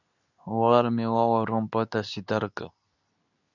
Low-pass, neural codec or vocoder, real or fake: 7.2 kHz; codec, 24 kHz, 0.9 kbps, WavTokenizer, medium speech release version 2; fake